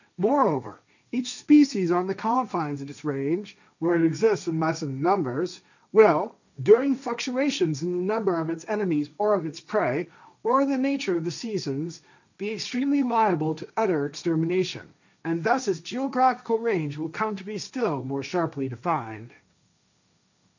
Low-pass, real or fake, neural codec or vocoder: 7.2 kHz; fake; codec, 16 kHz, 1.1 kbps, Voila-Tokenizer